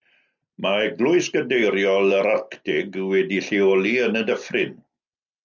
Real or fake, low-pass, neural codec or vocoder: real; 7.2 kHz; none